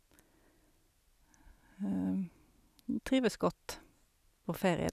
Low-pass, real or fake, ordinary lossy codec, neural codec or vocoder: 14.4 kHz; real; none; none